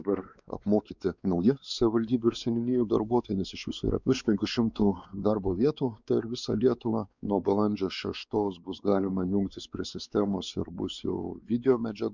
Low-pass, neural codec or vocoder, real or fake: 7.2 kHz; codec, 16 kHz, 4 kbps, X-Codec, WavLM features, trained on Multilingual LibriSpeech; fake